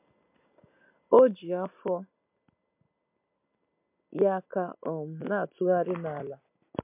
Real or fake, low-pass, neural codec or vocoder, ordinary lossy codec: real; 3.6 kHz; none; MP3, 32 kbps